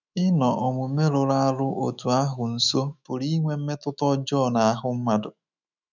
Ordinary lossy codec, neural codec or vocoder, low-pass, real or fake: none; autoencoder, 48 kHz, 128 numbers a frame, DAC-VAE, trained on Japanese speech; 7.2 kHz; fake